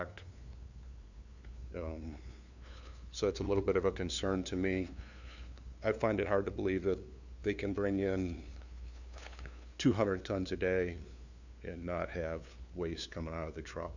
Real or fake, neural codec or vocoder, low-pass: fake; codec, 16 kHz, 2 kbps, FunCodec, trained on LibriTTS, 25 frames a second; 7.2 kHz